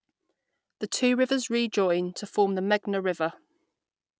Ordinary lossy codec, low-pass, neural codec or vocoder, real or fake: none; none; none; real